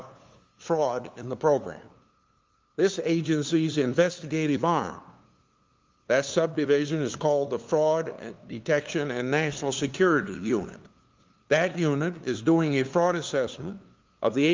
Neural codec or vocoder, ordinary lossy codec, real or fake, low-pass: codec, 16 kHz, 2 kbps, FunCodec, trained on LibriTTS, 25 frames a second; Opus, 32 kbps; fake; 7.2 kHz